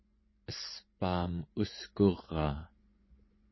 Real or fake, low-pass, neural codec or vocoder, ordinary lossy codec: fake; 7.2 kHz; vocoder, 24 kHz, 100 mel bands, Vocos; MP3, 24 kbps